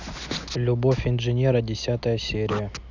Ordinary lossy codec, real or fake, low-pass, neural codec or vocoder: none; real; 7.2 kHz; none